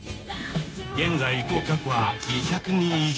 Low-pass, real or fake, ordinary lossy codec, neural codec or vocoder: none; fake; none; codec, 16 kHz, 0.9 kbps, LongCat-Audio-Codec